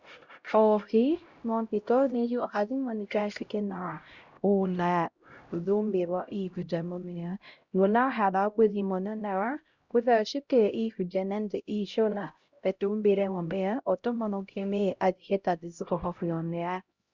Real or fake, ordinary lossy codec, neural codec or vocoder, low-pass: fake; Opus, 64 kbps; codec, 16 kHz, 0.5 kbps, X-Codec, HuBERT features, trained on LibriSpeech; 7.2 kHz